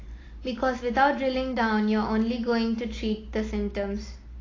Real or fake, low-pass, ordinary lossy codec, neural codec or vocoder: real; 7.2 kHz; AAC, 32 kbps; none